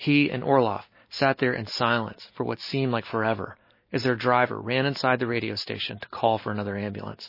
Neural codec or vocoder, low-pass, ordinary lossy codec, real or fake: none; 5.4 kHz; MP3, 24 kbps; real